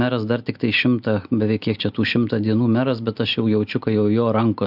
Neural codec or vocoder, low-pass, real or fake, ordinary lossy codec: none; 5.4 kHz; real; AAC, 48 kbps